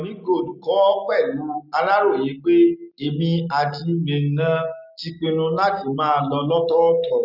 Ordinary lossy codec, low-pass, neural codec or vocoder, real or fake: none; 5.4 kHz; none; real